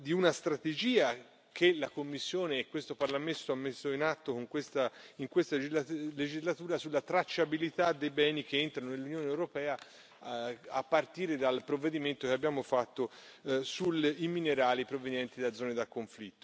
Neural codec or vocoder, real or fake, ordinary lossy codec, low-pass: none; real; none; none